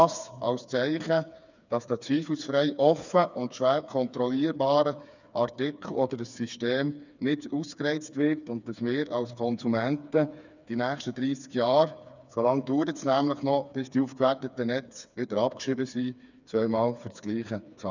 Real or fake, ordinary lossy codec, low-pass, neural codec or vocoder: fake; none; 7.2 kHz; codec, 16 kHz, 4 kbps, FreqCodec, smaller model